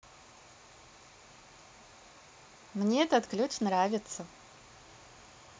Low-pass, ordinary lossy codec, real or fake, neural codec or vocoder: none; none; real; none